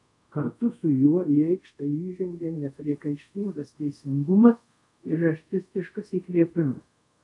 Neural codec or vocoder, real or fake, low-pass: codec, 24 kHz, 0.5 kbps, DualCodec; fake; 10.8 kHz